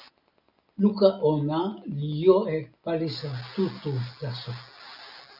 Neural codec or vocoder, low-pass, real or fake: none; 5.4 kHz; real